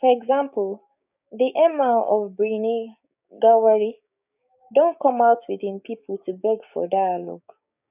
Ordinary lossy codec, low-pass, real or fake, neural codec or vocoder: none; 3.6 kHz; fake; codec, 16 kHz, 6 kbps, DAC